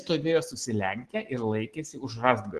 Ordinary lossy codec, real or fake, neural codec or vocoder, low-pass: Opus, 16 kbps; real; none; 10.8 kHz